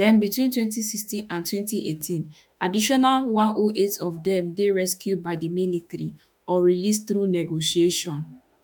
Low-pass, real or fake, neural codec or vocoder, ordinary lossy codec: 19.8 kHz; fake; autoencoder, 48 kHz, 32 numbers a frame, DAC-VAE, trained on Japanese speech; none